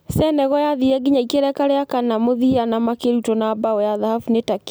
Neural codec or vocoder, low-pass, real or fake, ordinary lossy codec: none; none; real; none